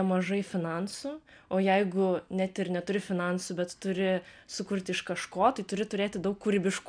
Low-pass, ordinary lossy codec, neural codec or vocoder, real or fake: 9.9 kHz; MP3, 96 kbps; none; real